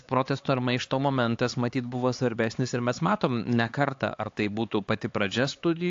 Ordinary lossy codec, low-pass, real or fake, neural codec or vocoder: AAC, 48 kbps; 7.2 kHz; fake; codec, 16 kHz, 4 kbps, X-Codec, HuBERT features, trained on LibriSpeech